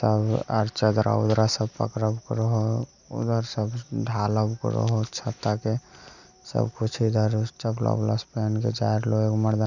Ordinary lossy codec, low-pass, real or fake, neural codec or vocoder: none; 7.2 kHz; real; none